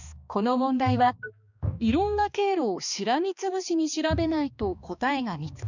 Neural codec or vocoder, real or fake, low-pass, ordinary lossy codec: codec, 16 kHz, 2 kbps, X-Codec, HuBERT features, trained on balanced general audio; fake; 7.2 kHz; none